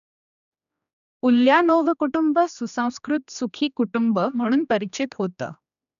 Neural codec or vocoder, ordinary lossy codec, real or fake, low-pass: codec, 16 kHz, 2 kbps, X-Codec, HuBERT features, trained on general audio; none; fake; 7.2 kHz